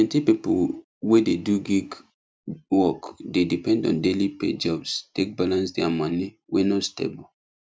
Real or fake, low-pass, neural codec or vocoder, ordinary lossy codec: real; none; none; none